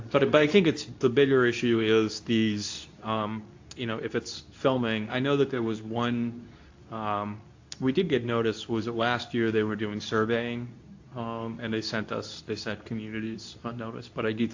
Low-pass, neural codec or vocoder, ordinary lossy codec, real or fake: 7.2 kHz; codec, 24 kHz, 0.9 kbps, WavTokenizer, medium speech release version 2; AAC, 48 kbps; fake